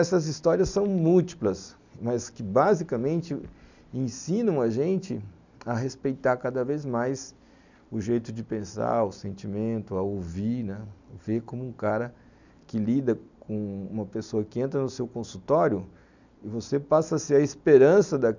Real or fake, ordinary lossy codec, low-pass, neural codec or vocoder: real; none; 7.2 kHz; none